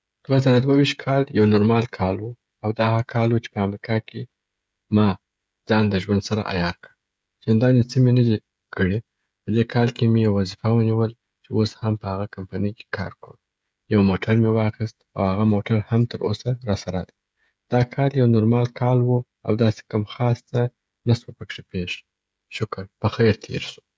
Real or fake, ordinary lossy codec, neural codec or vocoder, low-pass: fake; none; codec, 16 kHz, 16 kbps, FreqCodec, smaller model; none